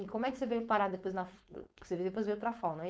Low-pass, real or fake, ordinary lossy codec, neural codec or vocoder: none; fake; none; codec, 16 kHz, 4.8 kbps, FACodec